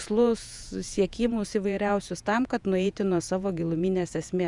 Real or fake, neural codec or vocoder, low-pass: fake; vocoder, 48 kHz, 128 mel bands, Vocos; 10.8 kHz